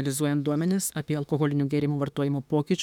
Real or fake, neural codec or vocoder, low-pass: fake; autoencoder, 48 kHz, 32 numbers a frame, DAC-VAE, trained on Japanese speech; 19.8 kHz